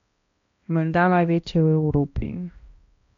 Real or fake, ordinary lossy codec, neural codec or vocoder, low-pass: fake; MP3, 48 kbps; codec, 16 kHz, 1 kbps, X-Codec, HuBERT features, trained on balanced general audio; 7.2 kHz